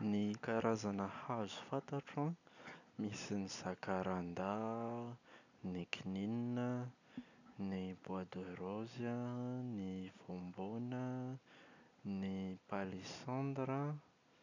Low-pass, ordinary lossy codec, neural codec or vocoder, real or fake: 7.2 kHz; none; none; real